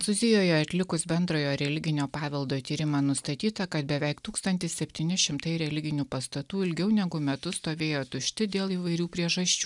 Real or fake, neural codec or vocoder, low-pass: real; none; 10.8 kHz